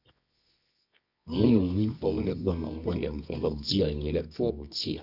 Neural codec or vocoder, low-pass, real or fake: codec, 24 kHz, 0.9 kbps, WavTokenizer, medium music audio release; 5.4 kHz; fake